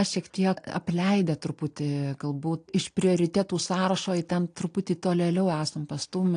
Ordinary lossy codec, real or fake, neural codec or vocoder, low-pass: AAC, 48 kbps; real; none; 9.9 kHz